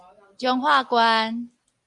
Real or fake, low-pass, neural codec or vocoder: real; 10.8 kHz; none